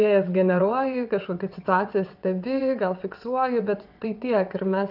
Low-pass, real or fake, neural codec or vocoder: 5.4 kHz; fake; vocoder, 22.05 kHz, 80 mel bands, WaveNeXt